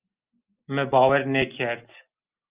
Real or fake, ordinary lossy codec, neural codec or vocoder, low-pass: real; Opus, 32 kbps; none; 3.6 kHz